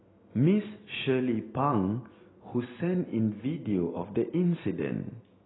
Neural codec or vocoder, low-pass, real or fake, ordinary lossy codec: none; 7.2 kHz; real; AAC, 16 kbps